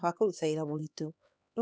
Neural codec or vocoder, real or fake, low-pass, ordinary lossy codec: codec, 16 kHz, 2 kbps, X-Codec, HuBERT features, trained on LibriSpeech; fake; none; none